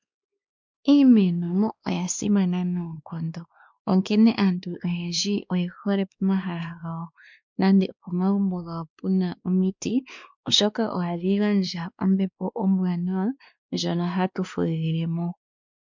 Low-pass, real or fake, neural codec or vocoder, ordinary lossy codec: 7.2 kHz; fake; codec, 16 kHz, 2 kbps, X-Codec, WavLM features, trained on Multilingual LibriSpeech; MP3, 64 kbps